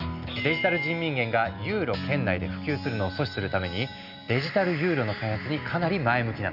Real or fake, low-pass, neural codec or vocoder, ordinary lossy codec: real; 5.4 kHz; none; none